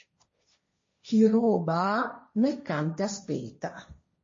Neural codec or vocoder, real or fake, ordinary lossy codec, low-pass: codec, 16 kHz, 1.1 kbps, Voila-Tokenizer; fake; MP3, 32 kbps; 7.2 kHz